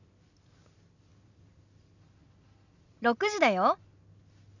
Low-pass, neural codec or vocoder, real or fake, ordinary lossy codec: 7.2 kHz; none; real; none